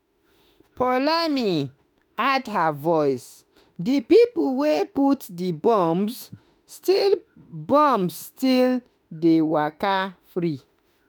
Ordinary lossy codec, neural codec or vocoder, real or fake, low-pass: none; autoencoder, 48 kHz, 32 numbers a frame, DAC-VAE, trained on Japanese speech; fake; none